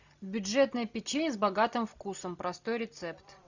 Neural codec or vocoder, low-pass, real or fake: none; 7.2 kHz; real